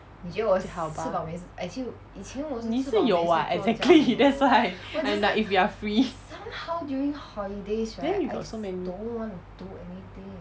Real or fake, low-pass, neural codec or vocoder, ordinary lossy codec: real; none; none; none